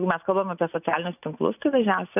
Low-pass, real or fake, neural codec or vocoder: 3.6 kHz; real; none